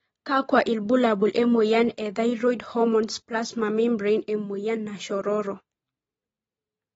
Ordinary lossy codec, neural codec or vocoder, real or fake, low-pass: AAC, 24 kbps; none; real; 14.4 kHz